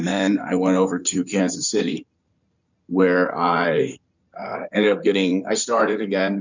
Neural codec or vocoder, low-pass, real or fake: codec, 16 kHz in and 24 kHz out, 2.2 kbps, FireRedTTS-2 codec; 7.2 kHz; fake